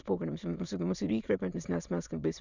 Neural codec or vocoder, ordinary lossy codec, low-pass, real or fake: autoencoder, 22.05 kHz, a latent of 192 numbers a frame, VITS, trained on many speakers; Opus, 64 kbps; 7.2 kHz; fake